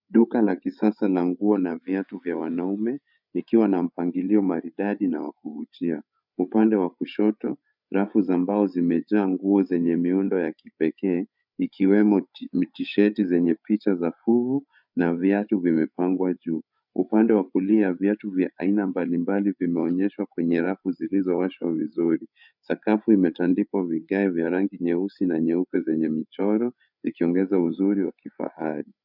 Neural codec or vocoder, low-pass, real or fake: codec, 16 kHz, 8 kbps, FreqCodec, larger model; 5.4 kHz; fake